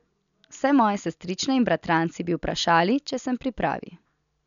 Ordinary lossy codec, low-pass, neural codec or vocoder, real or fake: none; 7.2 kHz; none; real